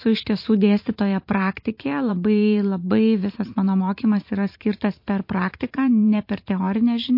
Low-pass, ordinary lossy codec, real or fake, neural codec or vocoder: 5.4 kHz; MP3, 32 kbps; fake; vocoder, 44.1 kHz, 128 mel bands every 512 samples, BigVGAN v2